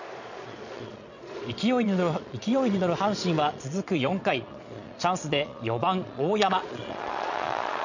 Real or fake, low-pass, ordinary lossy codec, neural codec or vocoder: fake; 7.2 kHz; none; vocoder, 22.05 kHz, 80 mel bands, Vocos